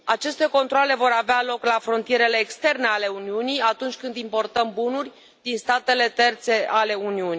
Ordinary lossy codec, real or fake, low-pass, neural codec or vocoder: none; real; none; none